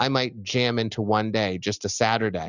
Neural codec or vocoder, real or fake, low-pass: none; real; 7.2 kHz